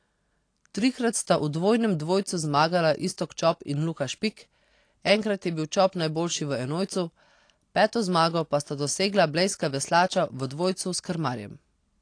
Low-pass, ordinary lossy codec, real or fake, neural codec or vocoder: 9.9 kHz; AAC, 48 kbps; real; none